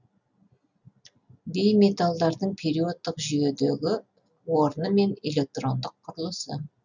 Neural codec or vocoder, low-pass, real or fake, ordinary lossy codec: none; 7.2 kHz; real; none